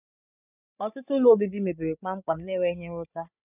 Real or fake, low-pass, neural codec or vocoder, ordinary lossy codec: fake; 3.6 kHz; codec, 16 kHz, 16 kbps, FreqCodec, larger model; none